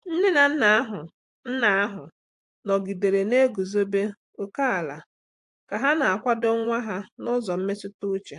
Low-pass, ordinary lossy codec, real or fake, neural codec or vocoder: 10.8 kHz; AAC, 64 kbps; fake; vocoder, 24 kHz, 100 mel bands, Vocos